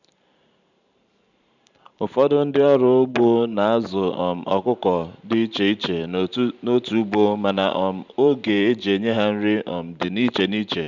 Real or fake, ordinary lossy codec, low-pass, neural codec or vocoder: fake; none; 7.2 kHz; vocoder, 44.1 kHz, 128 mel bands every 512 samples, BigVGAN v2